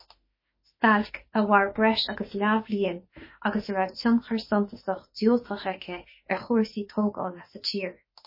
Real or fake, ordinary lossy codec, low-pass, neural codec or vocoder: fake; MP3, 24 kbps; 5.4 kHz; autoencoder, 48 kHz, 32 numbers a frame, DAC-VAE, trained on Japanese speech